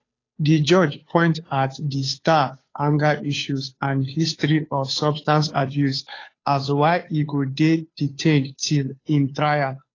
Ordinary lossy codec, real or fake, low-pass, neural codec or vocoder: AAC, 32 kbps; fake; 7.2 kHz; codec, 16 kHz, 2 kbps, FunCodec, trained on Chinese and English, 25 frames a second